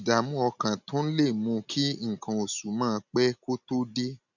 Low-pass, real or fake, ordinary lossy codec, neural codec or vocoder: 7.2 kHz; real; none; none